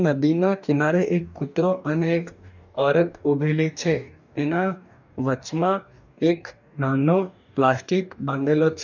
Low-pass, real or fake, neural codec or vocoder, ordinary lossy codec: 7.2 kHz; fake; codec, 44.1 kHz, 2.6 kbps, DAC; none